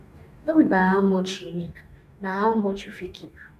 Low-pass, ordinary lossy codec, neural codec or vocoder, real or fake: 14.4 kHz; none; codec, 44.1 kHz, 2.6 kbps, DAC; fake